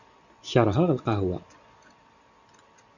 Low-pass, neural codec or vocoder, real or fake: 7.2 kHz; none; real